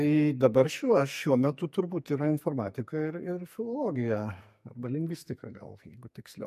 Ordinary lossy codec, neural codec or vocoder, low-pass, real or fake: MP3, 64 kbps; codec, 44.1 kHz, 2.6 kbps, SNAC; 14.4 kHz; fake